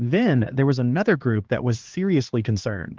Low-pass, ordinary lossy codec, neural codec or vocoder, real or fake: 7.2 kHz; Opus, 16 kbps; codec, 16 kHz, 2 kbps, X-Codec, HuBERT features, trained on LibriSpeech; fake